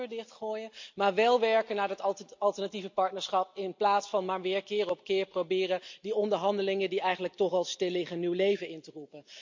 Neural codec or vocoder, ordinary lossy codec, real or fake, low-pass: none; MP3, 64 kbps; real; 7.2 kHz